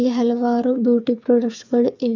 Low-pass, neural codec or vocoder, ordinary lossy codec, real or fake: 7.2 kHz; codec, 16 kHz, 8 kbps, FreqCodec, smaller model; none; fake